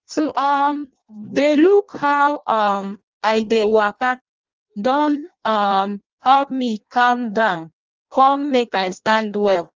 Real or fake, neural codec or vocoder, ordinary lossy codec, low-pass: fake; codec, 16 kHz in and 24 kHz out, 0.6 kbps, FireRedTTS-2 codec; Opus, 24 kbps; 7.2 kHz